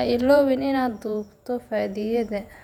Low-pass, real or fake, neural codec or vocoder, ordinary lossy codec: 19.8 kHz; fake; vocoder, 48 kHz, 128 mel bands, Vocos; none